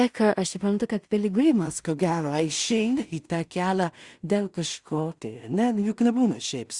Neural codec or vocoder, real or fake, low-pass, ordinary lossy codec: codec, 16 kHz in and 24 kHz out, 0.4 kbps, LongCat-Audio-Codec, two codebook decoder; fake; 10.8 kHz; Opus, 64 kbps